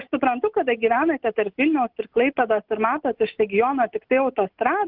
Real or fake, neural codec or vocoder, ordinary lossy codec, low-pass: real; none; Opus, 32 kbps; 5.4 kHz